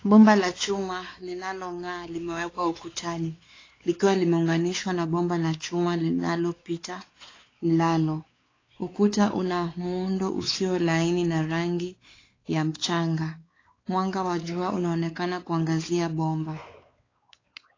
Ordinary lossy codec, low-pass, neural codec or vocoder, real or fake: AAC, 32 kbps; 7.2 kHz; codec, 16 kHz, 4 kbps, X-Codec, WavLM features, trained on Multilingual LibriSpeech; fake